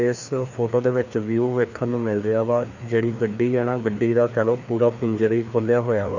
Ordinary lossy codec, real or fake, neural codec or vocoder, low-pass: none; fake; codec, 16 kHz, 2 kbps, FreqCodec, larger model; 7.2 kHz